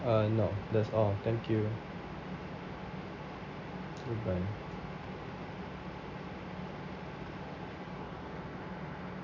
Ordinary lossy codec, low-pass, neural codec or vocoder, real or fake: none; 7.2 kHz; none; real